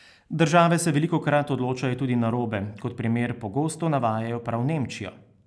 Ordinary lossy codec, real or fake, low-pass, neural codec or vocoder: none; real; none; none